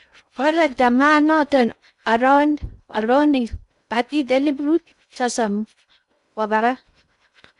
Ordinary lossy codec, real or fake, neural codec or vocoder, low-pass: none; fake; codec, 16 kHz in and 24 kHz out, 0.6 kbps, FocalCodec, streaming, 2048 codes; 10.8 kHz